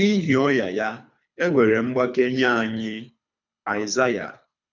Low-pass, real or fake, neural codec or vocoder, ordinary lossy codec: 7.2 kHz; fake; codec, 24 kHz, 3 kbps, HILCodec; none